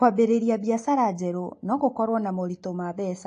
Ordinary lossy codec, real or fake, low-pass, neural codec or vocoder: AAC, 48 kbps; real; 9.9 kHz; none